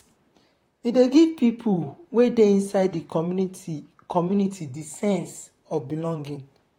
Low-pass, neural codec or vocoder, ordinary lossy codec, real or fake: 19.8 kHz; vocoder, 44.1 kHz, 128 mel bands, Pupu-Vocoder; AAC, 48 kbps; fake